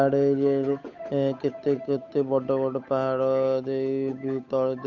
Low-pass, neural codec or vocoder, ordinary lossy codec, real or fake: 7.2 kHz; codec, 16 kHz, 8 kbps, FunCodec, trained on Chinese and English, 25 frames a second; none; fake